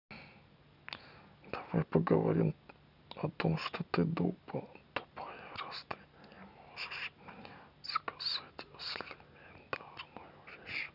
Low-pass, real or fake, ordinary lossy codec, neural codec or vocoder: 5.4 kHz; real; none; none